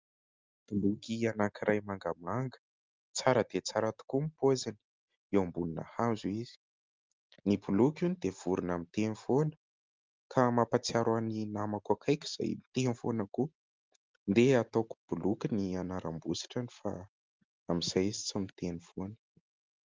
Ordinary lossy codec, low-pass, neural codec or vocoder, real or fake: Opus, 32 kbps; 7.2 kHz; none; real